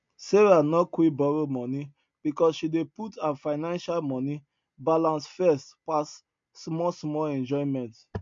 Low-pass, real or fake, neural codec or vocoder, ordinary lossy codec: 7.2 kHz; real; none; MP3, 48 kbps